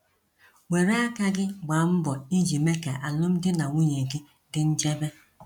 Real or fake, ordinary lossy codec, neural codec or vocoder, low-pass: real; none; none; 19.8 kHz